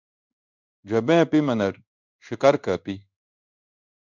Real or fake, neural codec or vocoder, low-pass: fake; codec, 16 kHz in and 24 kHz out, 1 kbps, XY-Tokenizer; 7.2 kHz